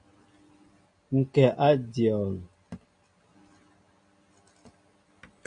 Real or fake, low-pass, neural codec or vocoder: real; 9.9 kHz; none